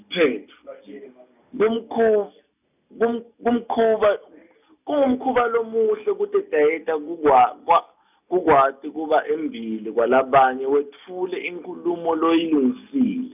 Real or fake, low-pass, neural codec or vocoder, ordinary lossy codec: real; 3.6 kHz; none; none